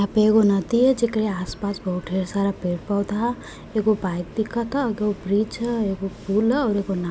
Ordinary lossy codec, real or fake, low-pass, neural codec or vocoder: none; real; none; none